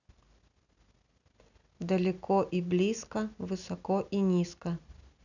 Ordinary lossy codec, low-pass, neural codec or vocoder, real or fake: none; 7.2 kHz; none; real